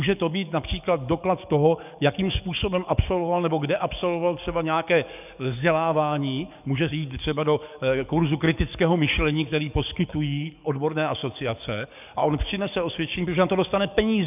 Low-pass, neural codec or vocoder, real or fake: 3.6 kHz; codec, 44.1 kHz, 7.8 kbps, DAC; fake